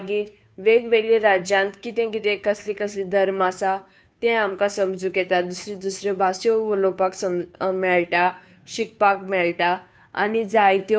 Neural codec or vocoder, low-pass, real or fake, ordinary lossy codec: codec, 16 kHz, 2 kbps, FunCodec, trained on Chinese and English, 25 frames a second; none; fake; none